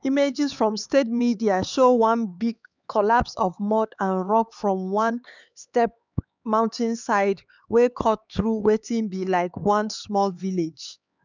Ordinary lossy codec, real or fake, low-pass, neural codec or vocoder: none; fake; 7.2 kHz; codec, 16 kHz, 4 kbps, X-Codec, HuBERT features, trained on LibriSpeech